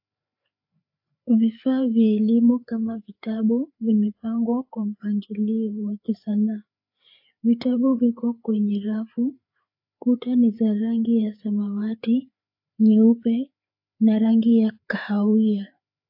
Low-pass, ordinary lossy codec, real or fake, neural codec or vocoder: 5.4 kHz; AAC, 48 kbps; fake; codec, 16 kHz, 4 kbps, FreqCodec, larger model